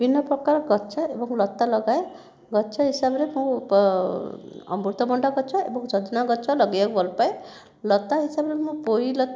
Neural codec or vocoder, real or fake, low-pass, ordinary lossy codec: none; real; none; none